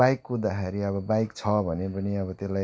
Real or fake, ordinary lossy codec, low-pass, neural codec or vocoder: real; none; none; none